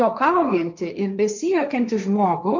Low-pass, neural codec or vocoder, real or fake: 7.2 kHz; codec, 16 kHz, 1.1 kbps, Voila-Tokenizer; fake